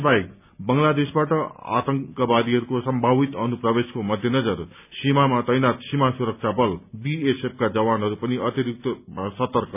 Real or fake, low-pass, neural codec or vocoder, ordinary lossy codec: real; 3.6 kHz; none; none